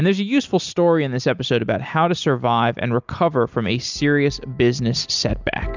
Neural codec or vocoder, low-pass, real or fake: none; 7.2 kHz; real